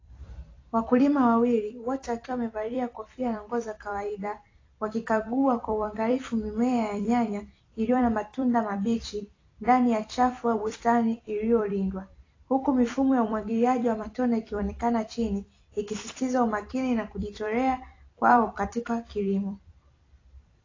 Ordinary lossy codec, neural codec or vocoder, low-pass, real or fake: AAC, 32 kbps; vocoder, 44.1 kHz, 128 mel bands every 256 samples, BigVGAN v2; 7.2 kHz; fake